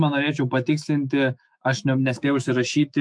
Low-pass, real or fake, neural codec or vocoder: 9.9 kHz; fake; autoencoder, 48 kHz, 128 numbers a frame, DAC-VAE, trained on Japanese speech